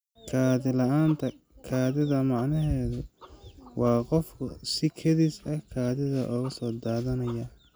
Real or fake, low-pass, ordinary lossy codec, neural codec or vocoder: real; none; none; none